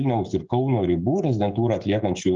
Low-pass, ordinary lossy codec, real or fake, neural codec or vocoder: 7.2 kHz; Opus, 24 kbps; fake; codec, 16 kHz, 16 kbps, FreqCodec, smaller model